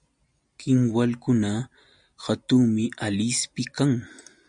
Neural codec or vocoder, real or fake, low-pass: none; real; 9.9 kHz